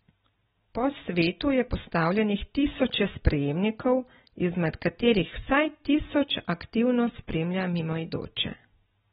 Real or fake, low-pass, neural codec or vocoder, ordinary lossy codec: real; 19.8 kHz; none; AAC, 16 kbps